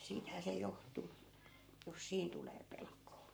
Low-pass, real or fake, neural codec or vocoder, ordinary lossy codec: none; fake; codec, 44.1 kHz, 7.8 kbps, Pupu-Codec; none